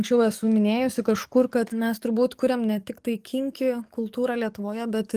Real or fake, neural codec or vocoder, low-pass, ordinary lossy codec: fake; codec, 44.1 kHz, 7.8 kbps, DAC; 14.4 kHz; Opus, 24 kbps